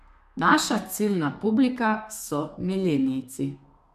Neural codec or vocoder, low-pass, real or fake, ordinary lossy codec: codec, 32 kHz, 1.9 kbps, SNAC; 14.4 kHz; fake; none